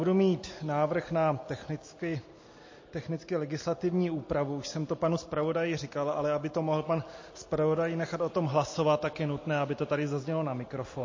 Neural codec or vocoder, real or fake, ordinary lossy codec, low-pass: none; real; MP3, 32 kbps; 7.2 kHz